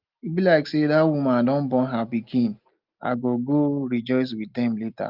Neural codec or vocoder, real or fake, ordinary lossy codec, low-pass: none; real; Opus, 32 kbps; 5.4 kHz